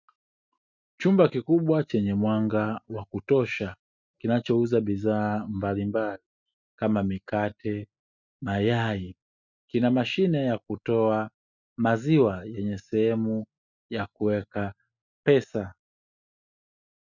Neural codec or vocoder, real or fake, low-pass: none; real; 7.2 kHz